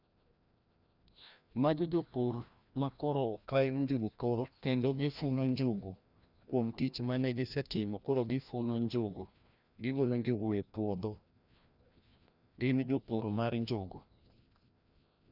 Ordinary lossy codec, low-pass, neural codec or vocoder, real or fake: none; 5.4 kHz; codec, 16 kHz, 1 kbps, FreqCodec, larger model; fake